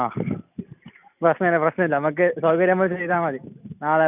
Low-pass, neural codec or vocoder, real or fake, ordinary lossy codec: 3.6 kHz; none; real; none